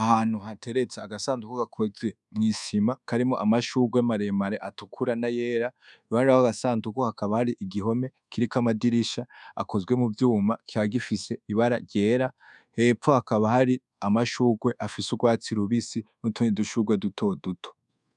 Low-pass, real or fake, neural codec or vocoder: 10.8 kHz; fake; codec, 24 kHz, 1.2 kbps, DualCodec